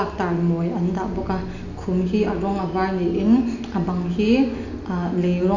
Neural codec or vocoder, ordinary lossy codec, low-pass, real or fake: none; none; 7.2 kHz; real